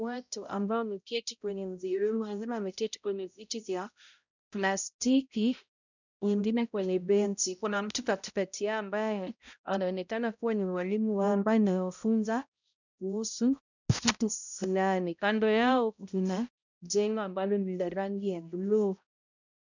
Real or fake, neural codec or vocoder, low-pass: fake; codec, 16 kHz, 0.5 kbps, X-Codec, HuBERT features, trained on balanced general audio; 7.2 kHz